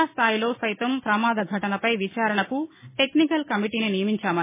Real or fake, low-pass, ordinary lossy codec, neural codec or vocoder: real; 3.6 kHz; MP3, 16 kbps; none